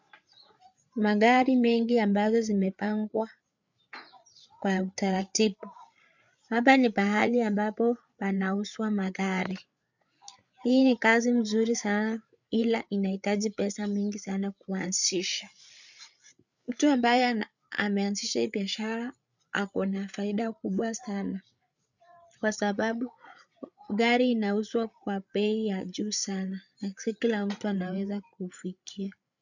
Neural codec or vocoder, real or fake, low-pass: codec, 16 kHz, 8 kbps, FreqCodec, larger model; fake; 7.2 kHz